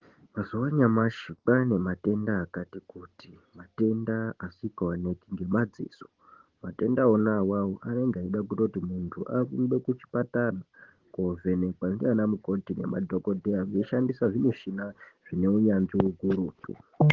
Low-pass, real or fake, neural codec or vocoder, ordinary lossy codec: 7.2 kHz; real; none; Opus, 16 kbps